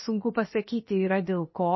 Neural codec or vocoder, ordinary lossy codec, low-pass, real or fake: codec, 16 kHz, 0.7 kbps, FocalCodec; MP3, 24 kbps; 7.2 kHz; fake